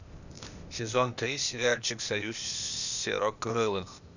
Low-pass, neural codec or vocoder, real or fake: 7.2 kHz; codec, 16 kHz, 0.8 kbps, ZipCodec; fake